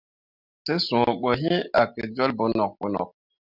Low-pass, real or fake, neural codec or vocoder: 5.4 kHz; real; none